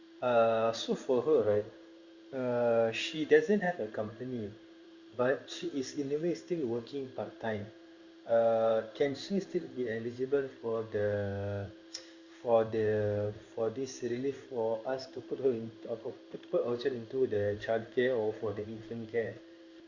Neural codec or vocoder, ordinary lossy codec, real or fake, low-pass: codec, 16 kHz in and 24 kHz out, 1 kbps, XY-Tokenizer; Opus, 64 kbps; fake; 7.2 kHz